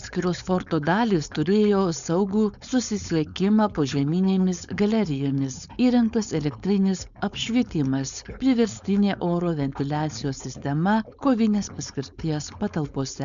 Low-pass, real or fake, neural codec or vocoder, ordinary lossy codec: 7.2 kHz; fake; codec, 16 kHz, 4.8 kbps, FACodec; MP3, 96 kbps